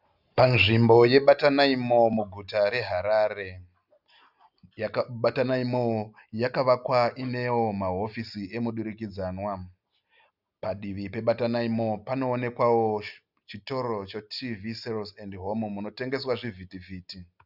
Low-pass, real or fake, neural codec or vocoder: 5.4 kHz; real; none